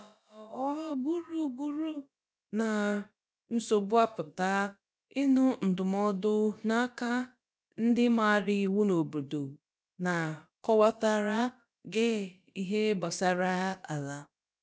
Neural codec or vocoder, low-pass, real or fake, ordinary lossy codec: codec, 16 kHz, about 1 kbps, DyCAST, with the encoder's durations; none; fake; none